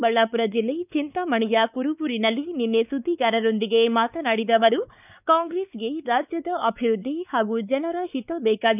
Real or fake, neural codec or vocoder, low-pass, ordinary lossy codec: fake; codec, 16 kHz, 4 kbps, X-Codec, WavLM features, trained on Multilingual LibriSpeech; 3.6 kHz; none